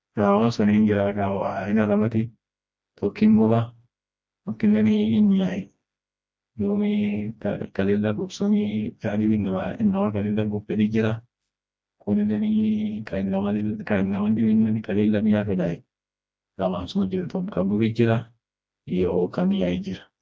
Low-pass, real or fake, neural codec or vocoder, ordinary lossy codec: none; fake; codec, 16 kHz, 1 kbps, FreqCodec, smaller model; none